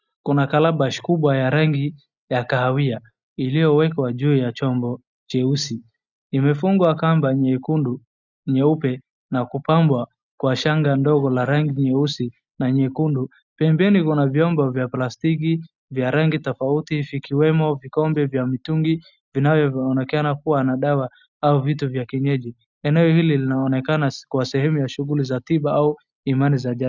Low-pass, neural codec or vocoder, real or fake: 7.2 kHz; none; real